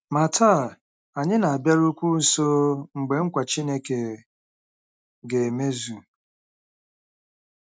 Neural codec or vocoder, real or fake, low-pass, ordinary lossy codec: none; real; none; none